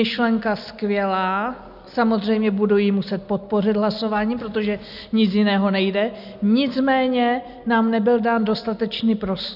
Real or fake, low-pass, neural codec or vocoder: real; 5.4 kHz; none